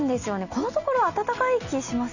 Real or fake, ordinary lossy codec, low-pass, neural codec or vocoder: real; none; 7.2 kHz; none